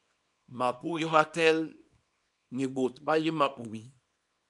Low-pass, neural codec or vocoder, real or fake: 10.8 kHz; codec, 24 kHz, 0.9 kbps, WavTokenizer, small release; fake